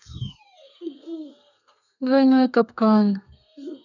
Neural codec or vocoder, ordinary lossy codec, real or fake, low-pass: codec, 32 kHz, 1.9 kbps, SNAC; none; fake; 7.2 kHz